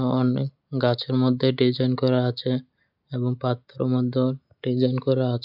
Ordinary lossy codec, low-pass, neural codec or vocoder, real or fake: none; 5.4 kHz; none; real